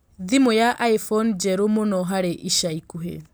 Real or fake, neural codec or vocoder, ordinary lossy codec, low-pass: real; none; none; none